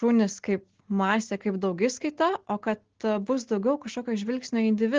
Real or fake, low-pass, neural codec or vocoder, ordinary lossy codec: real; 7.2 kHz; none; Opus, 16 kbps